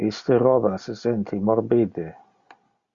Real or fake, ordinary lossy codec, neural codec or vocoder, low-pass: real; Opus, 64 kbps; none; 7.2 kHz